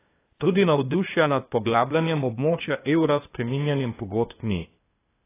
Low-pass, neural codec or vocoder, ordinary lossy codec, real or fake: 3.6 kHz; codec, 16 kHz, 0.8 kbps, ZipCodec; AAC, 16 kbps; fake